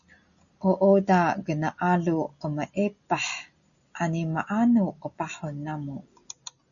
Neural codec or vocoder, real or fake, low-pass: none; real; 7.2 kHz